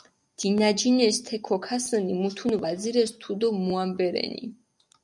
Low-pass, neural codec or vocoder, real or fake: 10.8 kHz; none; real